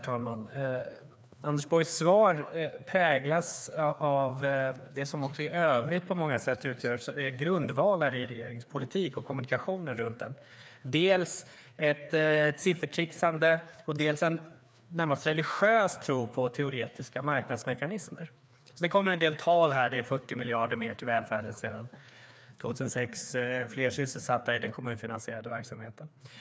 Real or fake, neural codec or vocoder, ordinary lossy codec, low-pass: fake; codec, 16 kHz, 2 kbps, FreqCodec, larger model; none; none